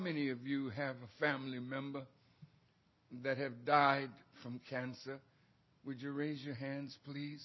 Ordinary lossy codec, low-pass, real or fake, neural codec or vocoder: MP3, 24 kbps; 7.2 kHz; real; none